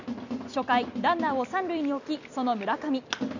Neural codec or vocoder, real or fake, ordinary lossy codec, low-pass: none; real; none; 7.2 kHz